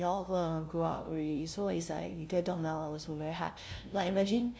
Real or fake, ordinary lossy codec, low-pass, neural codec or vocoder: fake; none; none; codec, 16 kHz, 0.5 kbps, FunCodec, trained on LibriTTS, 25 frames a second